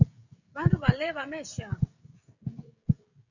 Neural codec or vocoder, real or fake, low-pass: codec, 16 kHz, 16 kbps, FreqCodec, smaller model; fake; 7.2 kHz